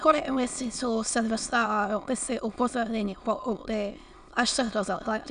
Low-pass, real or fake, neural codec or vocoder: 9.9 kHz; fake; autoencoder, 22.05 kHz, a latent of 192 numbers a frame, VITS, trained on many speakers